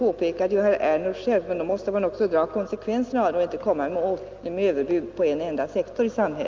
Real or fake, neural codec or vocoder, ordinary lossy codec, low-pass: real; none; Opus, 32 kbps; 7.2 kHz